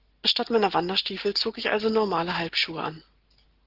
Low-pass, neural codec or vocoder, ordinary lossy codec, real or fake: 5.4 kHz; none; Opus, 16 kbps; real